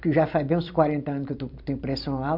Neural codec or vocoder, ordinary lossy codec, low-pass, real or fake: vocoder, 44.1 kHz, 128 mel bands every 256 samples, BigVGAN v2; MP3, 48 kbps; 5.4 kHz; fake